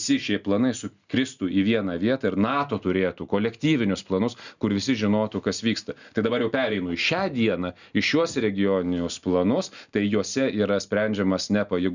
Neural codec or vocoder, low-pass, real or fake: none; 7.2 kHz; real